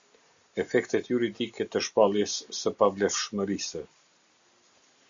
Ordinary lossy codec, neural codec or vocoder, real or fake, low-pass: Opus, 64 kbps; none; real; 7.2 kHz